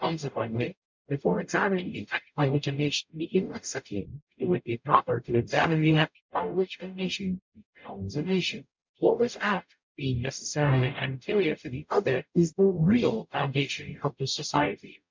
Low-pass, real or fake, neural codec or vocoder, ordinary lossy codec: 7.2 kHz; fake; codec, 44.1 kHz, 0.9 kbps, DAC; MP3, 64 kbps